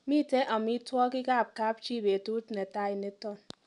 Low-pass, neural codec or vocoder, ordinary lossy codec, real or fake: 10.8 kHz; none; none; real